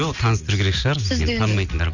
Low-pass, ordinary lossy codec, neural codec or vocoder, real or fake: 7.2 kHz; none; none; real